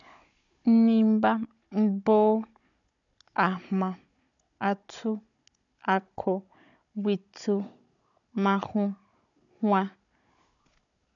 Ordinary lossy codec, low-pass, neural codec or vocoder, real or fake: none; 7.2 kHz; codec, 16 kHz, 16 kbps, FunCodec, trained on Chinese and English, 50 frames a second; fake